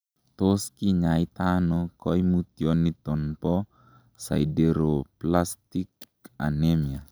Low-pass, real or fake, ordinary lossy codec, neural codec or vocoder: none; real; none; none